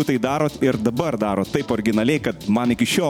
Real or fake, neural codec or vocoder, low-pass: fake; vocoder, 44.1 kHz, 128 mel bands every 512 samples, BigVGAN v2; 19.8 kHz